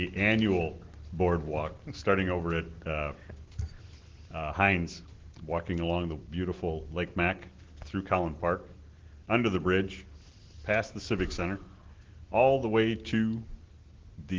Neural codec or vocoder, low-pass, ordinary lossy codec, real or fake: none; 7.2 kHz; Opus, 16 kbps; real